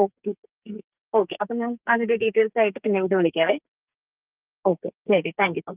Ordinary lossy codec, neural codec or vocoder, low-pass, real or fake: Opus, 24 kbps; codec, 44.1 kHz, 2.6 kbps, SNAC; 3.6 kHz; fake